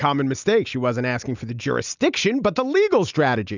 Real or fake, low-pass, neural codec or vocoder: real; 7.2 kHz; none